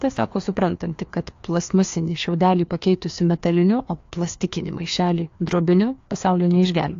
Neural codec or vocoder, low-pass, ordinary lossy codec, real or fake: codec, 16 kHz, 2 kbps, FreqCodec, larger model; 7.2 kHz; AAC, 48 kbps; fake